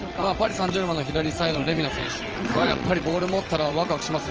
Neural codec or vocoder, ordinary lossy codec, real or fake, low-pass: vocoder, 22.05 kHz, 80 mel bands, WaveNeXt; Opus, 24 kbps; fake; 7.2 kHz